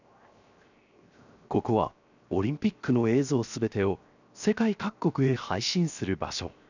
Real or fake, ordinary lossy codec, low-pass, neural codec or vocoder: fake; none; 7.2 kHz; codec, 16 kHz, 0.7 kbps, FocalCodec